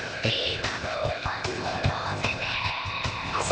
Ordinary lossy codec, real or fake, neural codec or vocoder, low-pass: none; fake; codec, 16 kHz, 0.8 kbps, ZipCodec; none